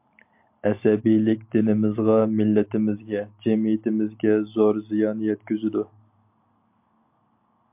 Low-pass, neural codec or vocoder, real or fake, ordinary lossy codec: 3.6 kHz; none; real; MP3, 32 kbps